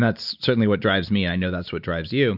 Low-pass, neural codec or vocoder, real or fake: 5.4 kHz; none; real